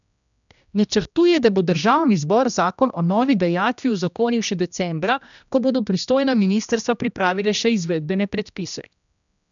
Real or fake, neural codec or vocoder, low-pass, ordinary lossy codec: fake; codec, 16 kHz, 1 kbps, X-Codec, HuBERT features, trained on general audio; 7.2 kHz; none